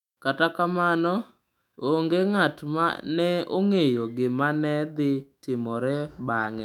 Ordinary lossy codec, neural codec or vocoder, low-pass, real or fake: none; autoencoder, 48 kHz, 128 numbers a frame, DAC-VAE, trained on Japanese speech; 19.8 kHz; fake